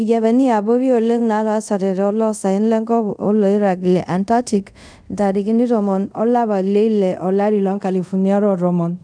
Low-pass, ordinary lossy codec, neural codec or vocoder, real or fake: 9.9 kHz; none; codec, 24 kHz, 0.5 kbps, DualCodec; fake